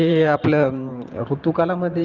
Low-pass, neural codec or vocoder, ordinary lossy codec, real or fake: 7.2 kHz; vocoder, 44.1 kHz, 128 mel bands every 512 samples, BigVGAN v2; Opus, 32 kbps; fake